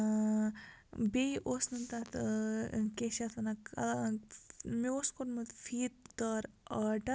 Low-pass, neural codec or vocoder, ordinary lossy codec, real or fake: none; none; none; real